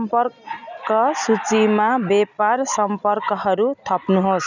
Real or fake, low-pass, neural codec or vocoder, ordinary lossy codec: real; 7.2 kHz; none; none